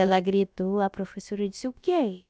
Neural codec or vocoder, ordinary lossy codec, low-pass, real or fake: codec, 16 kHz, about 1 kbps, DyCAST, with the encoder's durations; none; none; fake